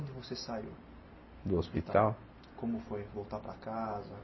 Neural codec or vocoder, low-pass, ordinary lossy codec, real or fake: none; 7.2 kHz; MP3, 24 kbps; real